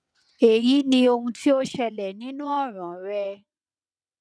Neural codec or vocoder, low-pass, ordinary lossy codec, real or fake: vocoder, 22.05 kHz, 80 mel bands, WaveNeXt; none; none; fake